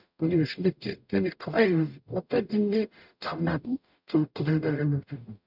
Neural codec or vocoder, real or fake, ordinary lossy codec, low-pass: codec, 44.1 kHz, 0.9 kbps, DAC; fake; none; 5.4 kHz